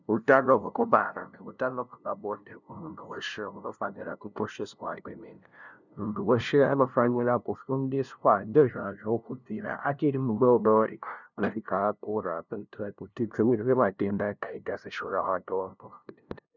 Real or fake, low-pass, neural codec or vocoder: fake; 7.2 kHz; codec, 16 kHz, 0.5 kbps, FunCodec, trained on LibriTTS, 25 frames a second